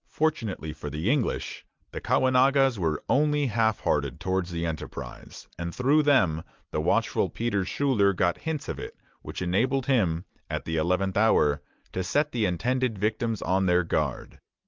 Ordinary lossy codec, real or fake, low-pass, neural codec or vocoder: Opus, 24 kbps; real; 7.2 kHz; none